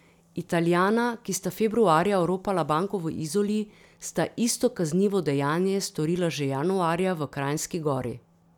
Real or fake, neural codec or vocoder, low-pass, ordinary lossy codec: real; none; 19.8 kHz; none